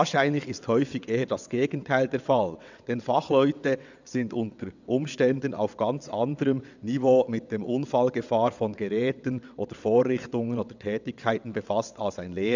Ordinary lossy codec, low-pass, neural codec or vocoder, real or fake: none; 7.2 kHz; vocoder, 22.05 kHz, 80 mel bands, WaveNeXt; fake